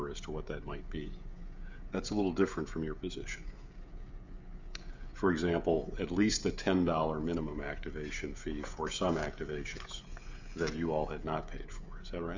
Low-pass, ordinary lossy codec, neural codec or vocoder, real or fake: 7.2 kHz; MP3, 64 kbps; codec, 16 kHz, 16 kbps, FreqCodec, smaller model; fake